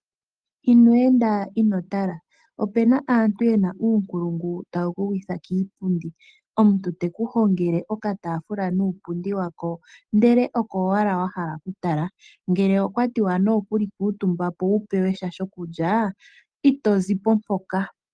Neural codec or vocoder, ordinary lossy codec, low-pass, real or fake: none; Opus, 24 kbps; 9.9 kHz; real